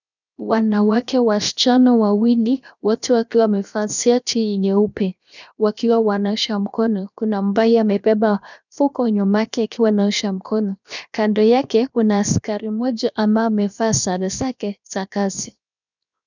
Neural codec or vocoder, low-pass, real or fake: codec, 16 kHz, 0.7 kbps, FocalCodec; 7.2 kHz; fake